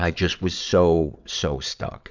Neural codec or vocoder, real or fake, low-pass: vocoder, 22.05 kHz, 80 mel bands, WaveNeXt; fake; 7.2 kHz